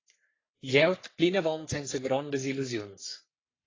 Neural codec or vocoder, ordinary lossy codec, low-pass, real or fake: codec, 44.1 kHz, 3.4 kbps, Pupu-Codec; AAC, 32 kbps; 7.2 kHz; fake